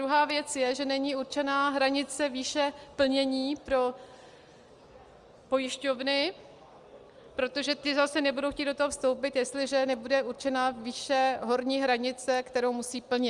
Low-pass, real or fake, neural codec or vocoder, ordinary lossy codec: 10.8 kHz; real; none; Opus, 32 kbps